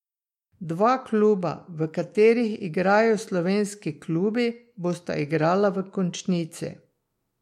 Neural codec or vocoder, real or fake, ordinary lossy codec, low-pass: autoencoder, 48 kHz, 128 numbers a frame, DAC-VAE, trained on Japanese speech; fake; MP3, 64 kbps; 19.8 kHz